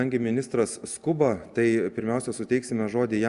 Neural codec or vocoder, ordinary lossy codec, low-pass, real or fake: none; Opus, 64 kbps; 10.8 kHz; real